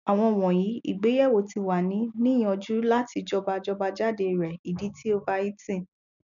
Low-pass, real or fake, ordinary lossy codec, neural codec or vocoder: 7.2 kHz; real; none; none